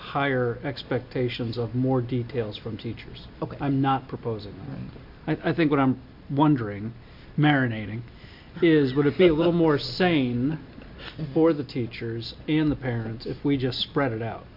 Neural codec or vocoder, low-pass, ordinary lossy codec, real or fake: none; 5.4 kHz; MP3, 48 kbps; real